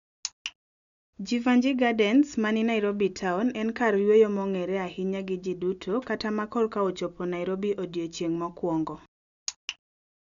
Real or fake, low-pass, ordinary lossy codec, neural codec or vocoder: real; 7.2 kHz; none; none